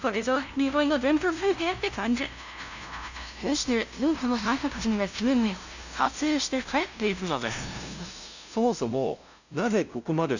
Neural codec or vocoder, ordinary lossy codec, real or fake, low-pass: codec, 16 kHz, 0.5 kbps, FunCodec, trained on LibriTTS, 25 frames a second; none; fake; 7.2 kHz